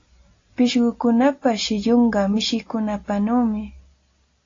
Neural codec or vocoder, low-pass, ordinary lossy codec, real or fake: none; 7.2 kHz; AAC, 32 kbps; real